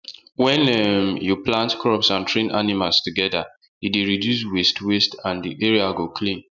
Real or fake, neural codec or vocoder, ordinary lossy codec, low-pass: real; none; none; 7.2 kHz